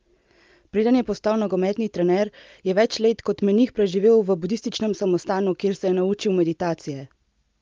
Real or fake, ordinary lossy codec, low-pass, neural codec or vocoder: real; Opus, 24 kbps; 7.2 kHz; none